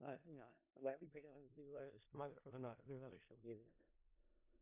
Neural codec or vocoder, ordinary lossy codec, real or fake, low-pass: codec, 16 kHz in and 24 kHz out, 0.4 kbps, LongCat-Audio-Codec, four codebook decoder; MP3, 32 kbps; fake; 3.6 kHz